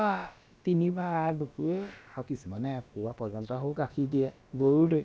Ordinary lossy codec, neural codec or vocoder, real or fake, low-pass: none; codec, 16 kHz, about 1 kbps, DyCAST, with the encoder's durations; fake; none